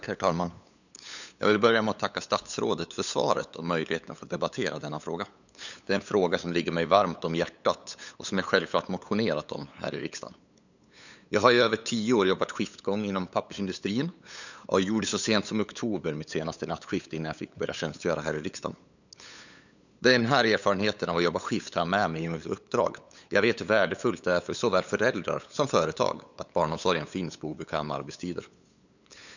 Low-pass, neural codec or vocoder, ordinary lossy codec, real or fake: 7.2 kHz; codec, 16 kHz, 8 kbps, FunCodec, trained on LibriTTS, 25 frames a second; none; fake